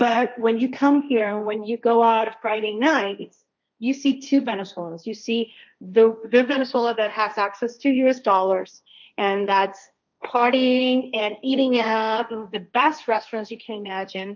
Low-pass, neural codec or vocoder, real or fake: 7.2 kHz; codec, 16 kHz, 1.1 kbps, Voila-Tokenizer; fake